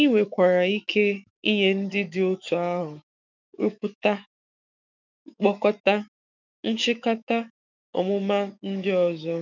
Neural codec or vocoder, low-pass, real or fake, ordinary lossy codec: autoencoder, 48 kHz, 128 numbers a frame, DAC-VAE, trained on Japanese speech; 7.2 kHz; fake; none